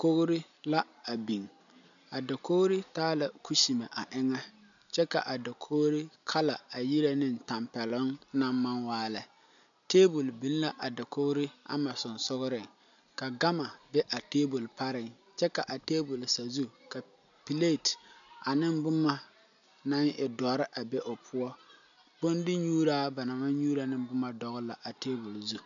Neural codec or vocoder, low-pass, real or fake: none; 7.2 kHz; real